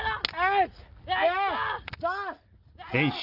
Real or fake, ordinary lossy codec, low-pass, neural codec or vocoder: real; Opus, 24 kbps; 5.4 kHz; none